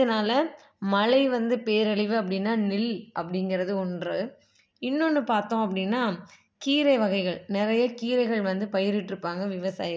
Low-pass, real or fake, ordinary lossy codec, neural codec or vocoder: none; real; none; none